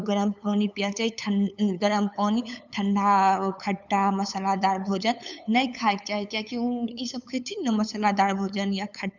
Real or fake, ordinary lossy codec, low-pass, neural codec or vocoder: fake; none; 7.2 kHz; codec, 16 kHz, 8 kbps, FunCodec, trained on LibriTTS, 25 frames a second